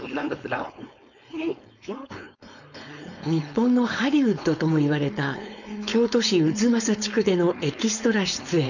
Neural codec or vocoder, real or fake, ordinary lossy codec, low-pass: codec, 16 kHz, 4.8 kbps, FACodec; fake; none; 7.2 kHz